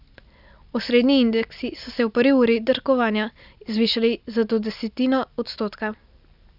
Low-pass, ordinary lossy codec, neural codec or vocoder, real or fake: 5.4 kHz; none; none; real